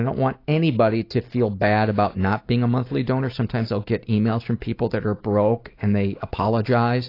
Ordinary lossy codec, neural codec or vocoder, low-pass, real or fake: AAC, 32 kbps; none; 5.4 kHz; real